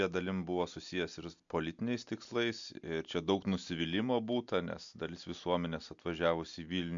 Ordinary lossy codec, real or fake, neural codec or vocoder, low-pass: MP3, 64 kbps; real; none; 7.2 kHz